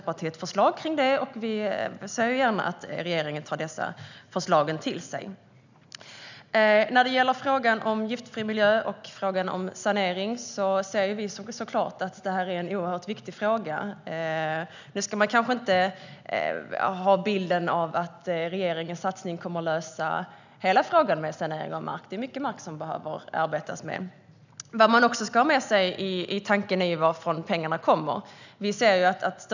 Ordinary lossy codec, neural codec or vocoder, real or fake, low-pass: none; none; real; 7.2 kHz